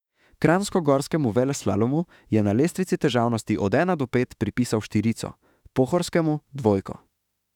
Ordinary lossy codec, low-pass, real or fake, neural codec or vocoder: none; 19.8 kHz; fake; autoencoder, 48 kHz, 32 numbers a frame, DAC-VAE, trained on Japanese speech